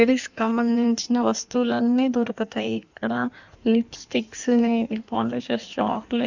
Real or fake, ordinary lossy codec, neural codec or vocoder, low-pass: fake; none; codec, 16 kHz in and 24 kHz out, 1.1 kbps, FireRedTTS-2 codec; 7.2 kHz